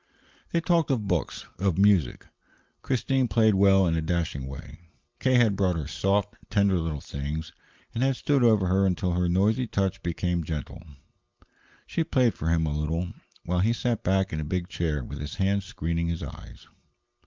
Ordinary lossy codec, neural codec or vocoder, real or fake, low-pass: Opus, 32 kbps; none; real; 7.2 kHz